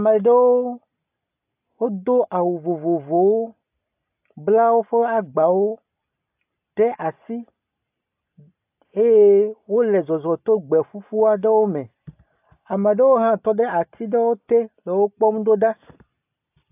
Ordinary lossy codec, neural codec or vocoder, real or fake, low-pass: AAC, 32 kbps; none; real; 3.6 kHz